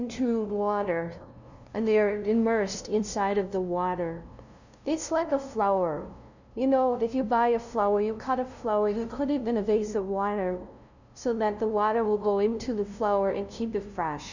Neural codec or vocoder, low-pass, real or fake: codec, 16 kHz, 0.5 kbps, FunCodec, trained on LibriTTS, 25 frames a second; 7.2 kHz; fake